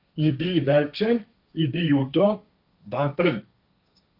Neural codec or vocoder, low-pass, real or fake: codec, 16 kHz, 1.1 kbps, Voila-Tokenizer; 5.4 kHz; fake